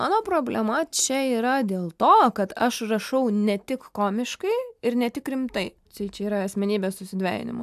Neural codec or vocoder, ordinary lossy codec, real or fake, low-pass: none; AAC, 96 kbps; real; 14.4 kHz